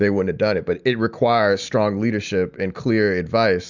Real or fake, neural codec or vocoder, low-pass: real; none; 7.2 kHz